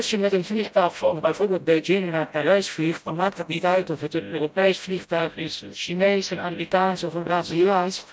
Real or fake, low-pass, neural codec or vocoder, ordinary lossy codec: fake; none; codec, 16 kHz, 0.5 kbps, FreqCodec, smaller model; none